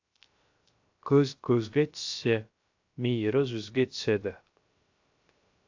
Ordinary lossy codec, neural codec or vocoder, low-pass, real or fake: AAC, 48 kbps; codec, 16 kHz, 0.3 kbps, FocalCodec; 7.2 kHz; fake